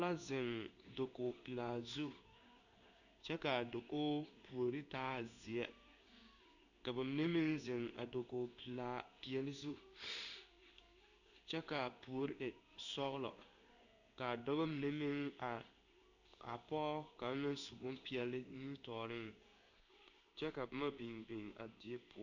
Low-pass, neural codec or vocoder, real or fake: 7.2 kHz; codec, 16 kHz in and 24 kHz out, 1 kbps, XY-Tokenizer; fake